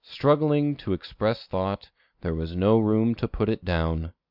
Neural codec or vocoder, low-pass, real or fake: none; 5.4 kHz; real